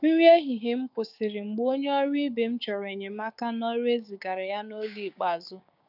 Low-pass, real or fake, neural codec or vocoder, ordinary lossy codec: 5.4 kHz; fake; codec, 16 kHz, 6 kbps, DAC; none